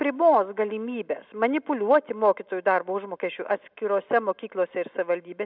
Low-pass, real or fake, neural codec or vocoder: 5.4 kHz; real; none